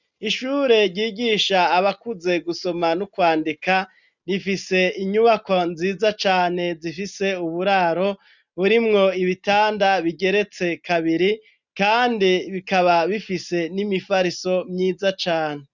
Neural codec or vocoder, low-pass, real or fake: none; 7.2 kHz; real